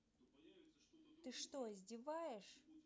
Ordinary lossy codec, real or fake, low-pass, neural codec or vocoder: none; real; none; none